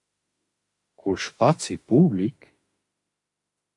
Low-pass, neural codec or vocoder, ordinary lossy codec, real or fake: 10.8 kHz; autoencoder, 48 kHz, 32 numbers a frame, DAC-VAE, trained on Japanese speech; AAC, 48 kbps; fake